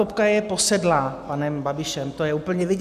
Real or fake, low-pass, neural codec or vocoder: real; 14.4 kHz; none